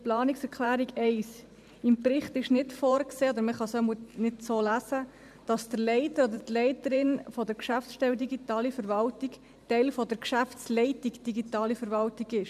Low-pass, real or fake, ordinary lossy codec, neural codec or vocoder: 14.4 kHz; fake; MP3, 96 kbps; vocoder, 44.1 kHz, 128 mel bands every 512 samples, BigVGAN v2